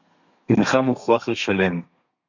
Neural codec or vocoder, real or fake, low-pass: codec, 32 kHz, 1.9 kbps, SNAC; fake; 7.2 kHz